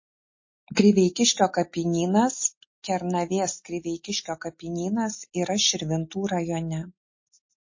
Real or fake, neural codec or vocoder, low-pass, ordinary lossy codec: real; none; 7.2 kHz; MP3, 32 kbps